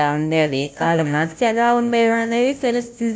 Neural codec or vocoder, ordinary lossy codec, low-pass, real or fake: codec, 16 kHz, 0.5 kbps, FunCodec, trained on Chinese and English, 25 frames a second; none; none; fake